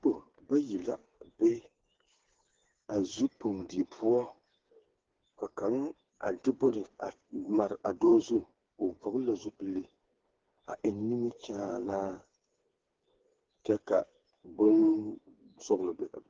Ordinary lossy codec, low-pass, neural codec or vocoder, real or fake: Opus, 16 kbps; 7.2 kHz; codec, 16 kHz, 4 kbps, FreqCodec, smaller model; fake